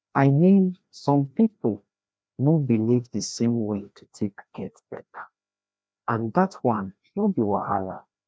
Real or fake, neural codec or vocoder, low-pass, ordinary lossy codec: fake; codec, 16 kHz, 1 kbps, FreqCodec, larger model; none; none